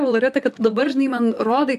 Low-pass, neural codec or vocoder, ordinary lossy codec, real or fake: 14.4 kHz; vocoder, 44.1 kHz, 128 mel bands, Pupu-Vocoder; AAC, 96 kbps; fake